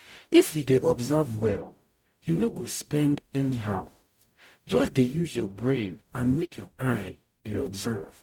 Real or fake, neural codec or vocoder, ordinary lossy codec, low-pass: fake; codec, 44.1 kHz, 0.9 kbps, DAC; MP3, 96 kbps; 19.8 kHz